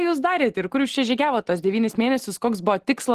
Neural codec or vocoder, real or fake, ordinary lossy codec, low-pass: none; real; Opus, 16 kbps; 14.4 kHz